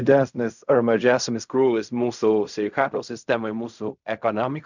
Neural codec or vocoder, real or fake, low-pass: codec, 16 kHz in and 24 kHz out, 0.4 kbps, LongCat-Audio-Codec, fine tuned four codebook decoder; fake; 7.2 kHz